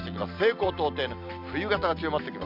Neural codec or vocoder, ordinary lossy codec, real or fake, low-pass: none; none; real; 5.4 kHz